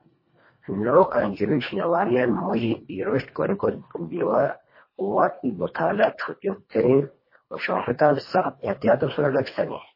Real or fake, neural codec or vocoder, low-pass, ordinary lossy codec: fake; codec, 24 kHz, 1.5 kbps, HILCodec; 5.4 kHz; MP3, 24 kbps